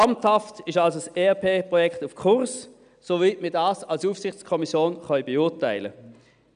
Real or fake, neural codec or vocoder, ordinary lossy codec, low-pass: real; none; none; 9.9 kHz